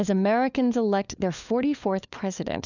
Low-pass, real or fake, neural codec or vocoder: 7.2 kHz; fake; codec, 16 kHz, 4 kbps, FunCodec, trained on LibriTTS, 50 frames a second